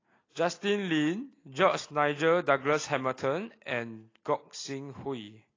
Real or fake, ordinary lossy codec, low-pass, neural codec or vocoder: real; AAC, 32 kbps; 7.2 kHz; none